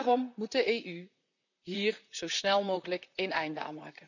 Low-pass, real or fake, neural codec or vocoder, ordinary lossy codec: 7.2 kHz; fake; vocoder, 44.1 kHz, 128 mel bands, Pupu-Vocoder; none